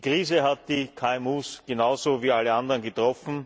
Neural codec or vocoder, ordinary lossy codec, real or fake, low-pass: none; none; real; none